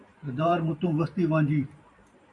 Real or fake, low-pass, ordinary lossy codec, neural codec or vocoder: fake; 10.8 kHz; AAC, 64 kbps; vocoder, 44.1 kHz, 128 mel bands every 512 samples, BigVGAN v2